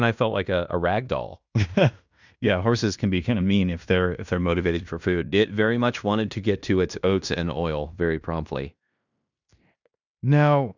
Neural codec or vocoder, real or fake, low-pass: codec, 16 kHz in and 24 kHz out, 0.9 kbps, LongCat-Audio-Codec, fine tuned four codebook decoder; fake; 7.2 kHz